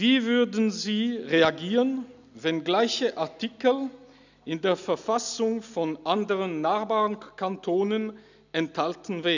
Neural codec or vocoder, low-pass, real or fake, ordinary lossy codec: none; 7.2 kHz; real; none